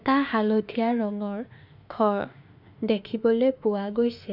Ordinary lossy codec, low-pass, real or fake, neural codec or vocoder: none; 5.4 kHz; fake; autoencoder, 48 kHz, 32 numbers a frame, DAC-VAE, trained on Japanese speech